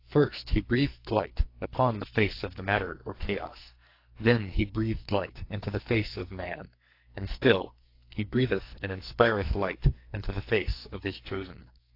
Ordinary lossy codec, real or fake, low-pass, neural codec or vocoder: AAC, 32 kbps; fake; 5.4 kHz; codec, 44.1 kHz, 2.6 kbps, SNAC